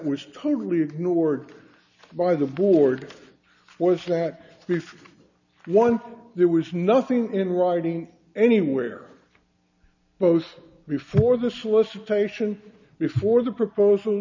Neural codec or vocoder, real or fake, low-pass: none; real; 7.2 kHz